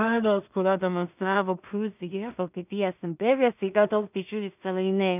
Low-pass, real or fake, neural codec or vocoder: 3.6 kHz; fake; codec, 16 kHz in and 24 kHz out, 0.4 kbps, LongCat-Audio-Codec, two codebook decoder